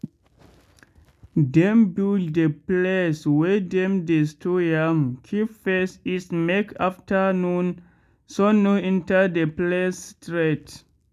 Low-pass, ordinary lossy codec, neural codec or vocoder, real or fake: 14.4 kHz; none; none; real